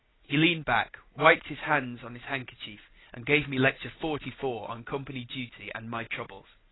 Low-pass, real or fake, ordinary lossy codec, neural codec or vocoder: 7.2 kHz; real; AAC, 16 kbps; none